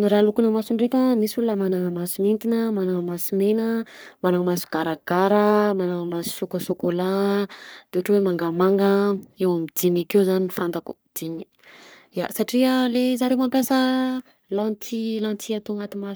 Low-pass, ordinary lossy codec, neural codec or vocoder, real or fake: none; none; codec, 44.1 kHz, 3.4 kbps, Pupu-Codec; fake